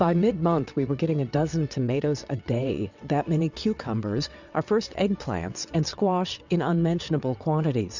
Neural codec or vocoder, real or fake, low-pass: vocoder, 22.05 kHz, 80 mel bands, WaveNeXt; fake; 7.2 kHz